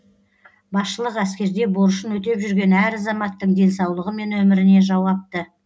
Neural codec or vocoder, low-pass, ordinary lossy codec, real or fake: none; none; none; real